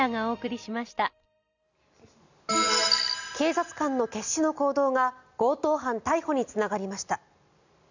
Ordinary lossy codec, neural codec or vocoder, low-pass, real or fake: none; none; 7.2 kHz; real